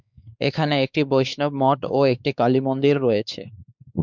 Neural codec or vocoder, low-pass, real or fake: codec, 16 kHz, 4 kbps, X-Codec, WavLM features, trained on Multilingual LibriSpeech; 7.2 kHz; fake